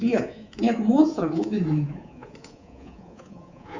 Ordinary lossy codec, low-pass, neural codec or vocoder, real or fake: Opus, 64 kbps; 7.2 kHz; codec, 24 kHz, 3.1 kbps, DualCodec; fake